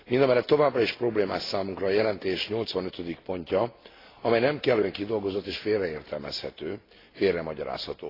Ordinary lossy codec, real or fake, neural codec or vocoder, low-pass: AAC, 24 kbps; real; none; 5.4 kHz